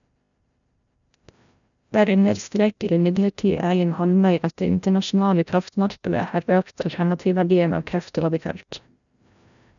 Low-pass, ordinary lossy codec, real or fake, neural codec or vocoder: 7.2 kHz; none; fake; codec, 16 kHz, 0.5 kbps, FreqCodec, larger model